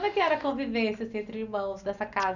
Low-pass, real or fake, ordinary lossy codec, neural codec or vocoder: 7.2 kHz; real; none; none